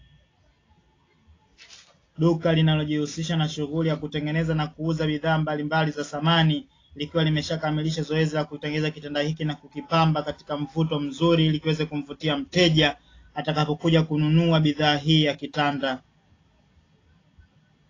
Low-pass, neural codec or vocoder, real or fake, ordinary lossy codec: 7.2 kHz; none; real; AAC, 32 kbps